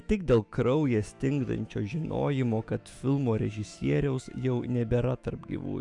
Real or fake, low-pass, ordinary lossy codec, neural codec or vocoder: fake; 10.8 kHz; Opus, 64 kbps; autoencoder, 48 kHz, 128 numbers a frame, DAC-VAE, trained on Japanese speech